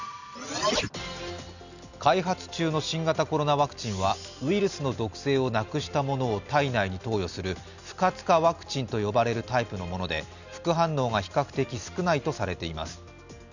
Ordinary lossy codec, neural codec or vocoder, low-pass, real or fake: none; none; 7.2 kHz; real